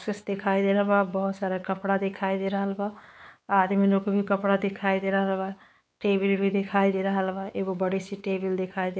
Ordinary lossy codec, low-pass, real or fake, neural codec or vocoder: none; none; fake; codec, 16 kHz, 4 kbps, X-Codec, WavLM features, trained on Multilingual LibriSpeech